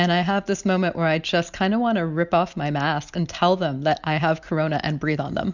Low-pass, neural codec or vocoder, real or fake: 7.2 kHz; none; real